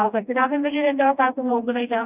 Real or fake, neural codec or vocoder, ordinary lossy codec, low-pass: fake; codec, 16 kHz, 1 kbps, FreqCodec, smaller model; none; 3.6 kHz